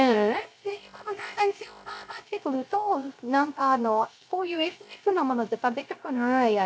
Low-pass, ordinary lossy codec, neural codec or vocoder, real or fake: none; none; codec, 16 kHz, 0.3 kbps, FocalCodec; fake